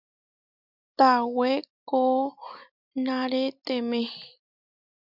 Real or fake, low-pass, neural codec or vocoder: real; 5.4 kHz; none